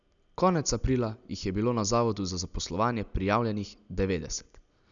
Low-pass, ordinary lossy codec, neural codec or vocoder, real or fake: 7.2 kHz; none; none; real